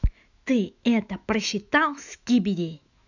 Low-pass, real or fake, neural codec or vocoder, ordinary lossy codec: 7.2 kHz; fake; autoencoder, 48 kHz, 128 numbers a frame, DAC-VAE, trained on Japanese speech; none